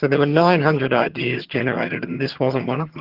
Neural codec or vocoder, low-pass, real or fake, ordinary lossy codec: vocoder, 22.05 kHz, 80 mel bands, HiFi-GAN; 5.4 kHz; fake; Opus, 16 kbps